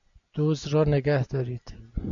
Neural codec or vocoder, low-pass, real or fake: none; 7.2 kHz; real